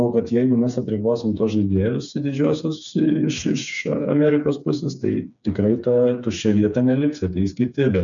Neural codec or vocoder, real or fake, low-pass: codec, 16 kHz, 4 kbps, FreqCodec, smaller model; fake; 7.2 kHz